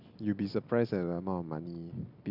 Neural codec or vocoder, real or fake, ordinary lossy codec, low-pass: none; real; none; 5.4 kHz